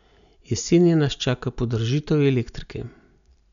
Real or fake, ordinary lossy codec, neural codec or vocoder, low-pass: real; none; none; 7.2 kHz